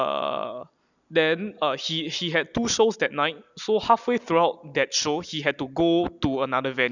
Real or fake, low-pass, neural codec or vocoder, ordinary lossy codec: real; 7.2 kHz; none; none